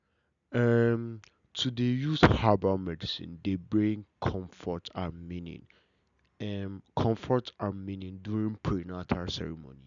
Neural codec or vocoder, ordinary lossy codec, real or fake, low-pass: none; MP3, 96 kbps; real; 7.2 kHz